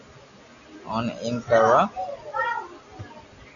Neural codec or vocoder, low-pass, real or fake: none; 7.2 kHz; real